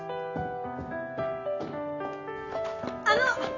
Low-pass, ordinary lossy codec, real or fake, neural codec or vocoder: 7.2 kHz; none; real; none